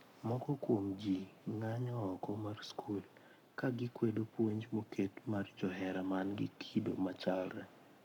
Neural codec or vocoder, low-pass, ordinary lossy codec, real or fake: codec, 44.1 kHz, 7.8 kbps, Pupu-Codec; 19.8 kHz; none; fake